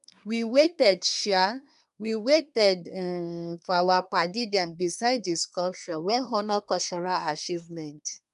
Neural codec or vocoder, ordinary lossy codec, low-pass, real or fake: codec, 24 kHz, 1 kbps, SNAC; none; 10.8 kHz; fake